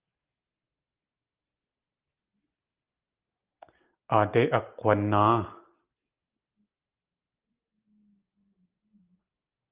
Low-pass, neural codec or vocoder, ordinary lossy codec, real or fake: 3.6 kHz; none; Opus, 32 kbps; real